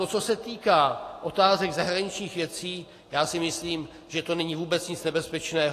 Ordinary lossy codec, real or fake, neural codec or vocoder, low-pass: AAC, 48 kbps; real; none; 14.4 kHz